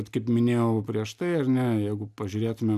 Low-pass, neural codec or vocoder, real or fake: 14.4 kHz; none; real